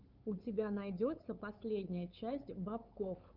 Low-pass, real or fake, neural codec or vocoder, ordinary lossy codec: 5.4 kHz; fake; codec, 16 kHz, 8 kbps, FunCodec, trained on LibriTTS, 25 frames a second; Opus, 24 kbps